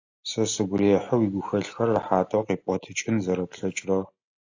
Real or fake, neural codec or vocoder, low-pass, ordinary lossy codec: real; none; 7.2 kHz; AAC, 48 kbps